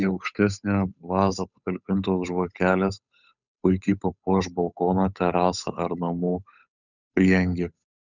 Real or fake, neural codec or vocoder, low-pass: fake; codec, 16 kHz, 8 kbps, FunCodec, trained on Chinese and English, 25 frames a second; 7.2 kHz